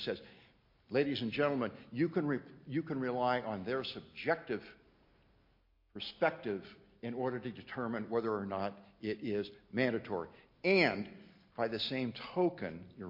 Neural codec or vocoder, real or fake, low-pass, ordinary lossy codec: none; real; 5.4 kHz; MP3, 32 kbps